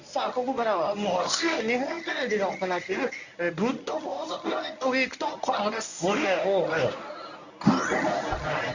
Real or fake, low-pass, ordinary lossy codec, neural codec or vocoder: fake; 7.2 kHz; none; codec, 24 kHz, 0.9 kbps, WavTokenizer, medium speech release version 1